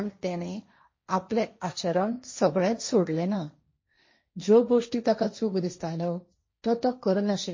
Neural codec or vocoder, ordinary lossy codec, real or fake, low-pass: codec, 16 kHz, 1.1 kbps, Voila-Tokenizer; MP3, 32 kbps; fake; 7.2 kHz